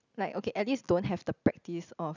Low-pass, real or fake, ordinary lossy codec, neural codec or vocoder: 7.2 kHz; real; none; none